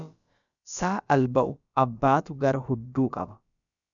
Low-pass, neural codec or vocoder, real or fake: 7.2 kHz; codec, 16 kHz, about 1 kbps, DyCAST, with the encoder's durations; fake